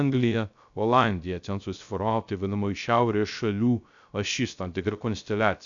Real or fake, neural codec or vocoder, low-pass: fake; codec, 16 kHz, 0.3 kbps, FocalCodec; 7.2 kHz